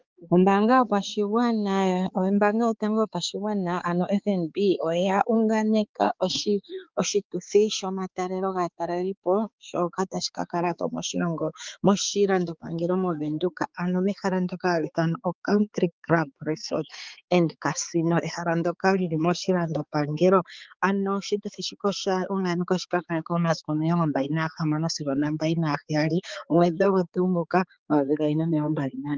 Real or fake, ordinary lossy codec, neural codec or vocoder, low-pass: fake; Opus, 32 kbps; codec, 16 kHz, 4 kbps, X-Codec, HuBERT features, trained on balanced general audio; 7.2 kHz